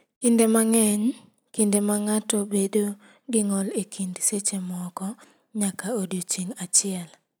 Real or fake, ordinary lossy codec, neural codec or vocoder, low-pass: real; none; none; none